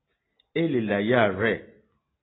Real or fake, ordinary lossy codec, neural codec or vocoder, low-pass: real; AAC, 16 kbps; none; 7.2 kHz